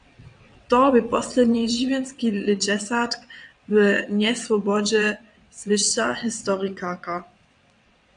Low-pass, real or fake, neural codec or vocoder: 9.9 kHz; fake; vocoder, 22.05 kHz, 80 mel bands, WaveNeXt